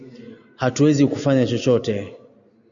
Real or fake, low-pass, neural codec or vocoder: real; 7.2 kHz; none